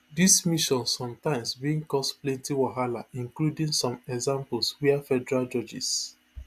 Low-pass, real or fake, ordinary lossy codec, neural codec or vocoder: 14.4 kHz; real; AAC, 96 kbps; none